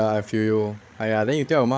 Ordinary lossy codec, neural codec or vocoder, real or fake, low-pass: none; codec, 16 kHz, 16 kbps, FreqCodec, larger model; fake; none